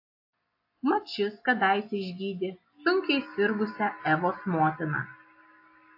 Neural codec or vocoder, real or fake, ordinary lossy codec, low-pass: none; real; AAC, 32 kbps; 5.4 kHz